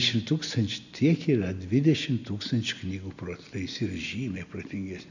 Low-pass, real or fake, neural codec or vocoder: 7.2 kHz; real; none